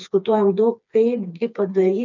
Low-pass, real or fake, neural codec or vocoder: 7.2 kHz; fake; codec, 16 kHz, 4 kbps, FreqCodec, smaller model